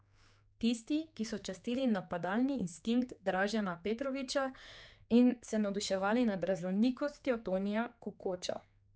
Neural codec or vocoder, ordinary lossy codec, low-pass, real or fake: codec, 16 kHz, 4 kbps, X-Codec, HuBERT features, trained on general audio; none; none; fake